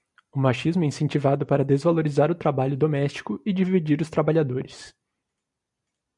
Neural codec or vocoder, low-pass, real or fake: none; 10.8 kHz; real